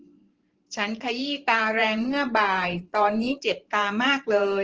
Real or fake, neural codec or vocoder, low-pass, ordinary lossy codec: fake; vocoder, 44.1 kHz, 128 mel bands every 512 samples, BigVGAN v2; 7.2 kHz; Opus, 16 kbps